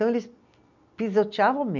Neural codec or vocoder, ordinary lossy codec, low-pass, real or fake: none; none; 7.2 kHz; real